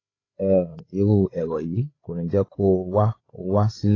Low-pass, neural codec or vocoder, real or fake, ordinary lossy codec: 7.2 kHz; codec, 16 kHz, 8 kbps, FreqCodec, larger model; fake; AAC, 32 kbps